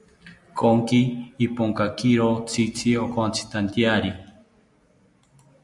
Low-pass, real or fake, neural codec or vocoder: 10.8 kHz; real; none